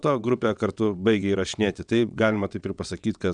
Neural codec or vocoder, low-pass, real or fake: vocoder, 22.05 kHz, 80 mel bands, WaveNeXt; 9.9 kHz; fake